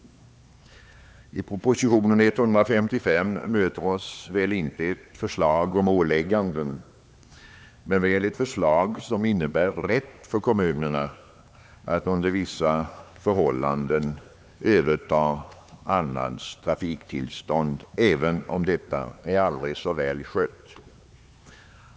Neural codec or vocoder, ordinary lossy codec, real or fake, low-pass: codec, 16 kHz, 4 kbps, X-Codec, HuBERT features, trained on LibriSpeech; none; fake; none